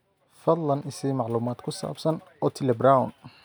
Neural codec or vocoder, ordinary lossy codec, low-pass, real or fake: none; none; none; real